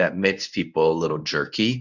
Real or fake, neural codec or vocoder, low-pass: fake; codec, 24 kHz, 0.9 kbps, WavTokenizer, medium speech release version 1; 7.2 kHz